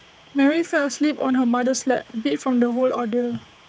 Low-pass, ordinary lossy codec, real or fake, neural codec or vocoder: none; none; fake; codec, 16 kHz, 4 kbps, X-Codec, HuBERT features, trained on general audio